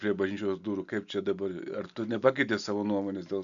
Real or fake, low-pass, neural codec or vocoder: real; 7.2 kHz; none